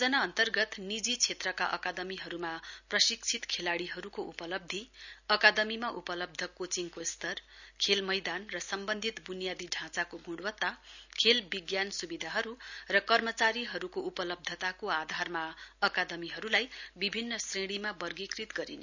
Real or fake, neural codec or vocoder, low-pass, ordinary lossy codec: real; none; 7.2 kHz; none